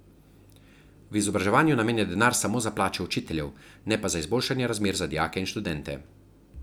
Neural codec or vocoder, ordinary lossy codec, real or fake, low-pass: none; none; real; none